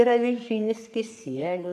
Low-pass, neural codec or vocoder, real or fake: 14.4 kHz; codec, 44.1 kHz, 3.4 kbps, Pupu-Codec; fake